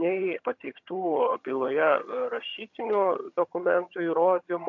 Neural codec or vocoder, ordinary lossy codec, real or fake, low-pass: vocoder, 22.05 kHz, 80 mel bands, HiFi-GAN; MP3, 48 kbps; fake; 7.2 kHz